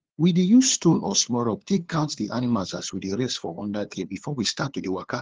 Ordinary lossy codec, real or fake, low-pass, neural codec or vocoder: Opus, 16 kbps; fake; 7.2 kHz; codec, 16 kHz, 2 kbps, FunCodec, trained on LibriTTS, 25 frames a second